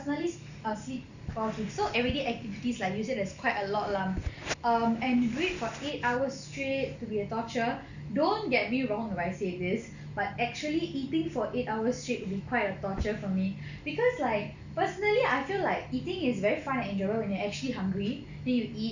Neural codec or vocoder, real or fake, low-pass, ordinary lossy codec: none; real; 7.2 kHz; none